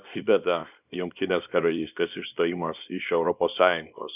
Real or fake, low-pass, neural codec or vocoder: fake; 3.6 kHz; codec, 24 kHz, 0.9 kbps, WavTokenizer, small release